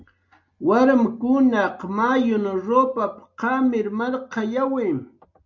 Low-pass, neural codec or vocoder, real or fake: 7.2 kHz; none; real